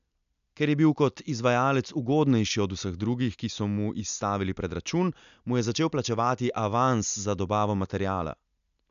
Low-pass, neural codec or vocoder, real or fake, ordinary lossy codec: 7.2 kHz; none; real; none